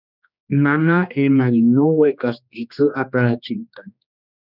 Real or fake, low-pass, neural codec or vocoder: fake; 5.4 kHz; codec, 16 kHz, 2 kbps, X-Codec, HuBERT features, trained on general audio